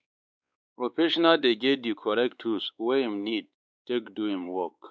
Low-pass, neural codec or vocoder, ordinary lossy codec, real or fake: none; codec, 16 kHz, 4 kbps, X-Codec, WavLM features, trained on Multilingual LibriSpeech; none; fake